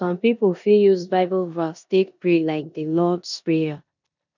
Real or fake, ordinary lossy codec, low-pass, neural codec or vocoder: fake; none; 7.2 kHz; codec, 16 kHz in and 24 kHz out, 0.9 kbps, LongCat-Audio-Codec, four codebook decoder